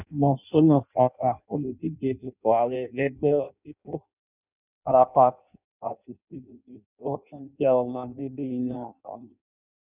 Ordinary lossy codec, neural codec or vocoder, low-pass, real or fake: none; codec, 16 kHz in and 24 kHz out, 0.6 kbps, FireRedTTS-2 codec; 3.6 kHz; fake